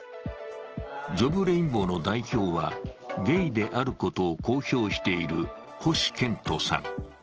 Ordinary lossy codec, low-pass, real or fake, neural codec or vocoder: Opus, 16 kbps; 7.2 kHz; real; none